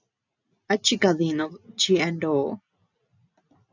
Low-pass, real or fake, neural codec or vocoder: 7.2 kHz; real; none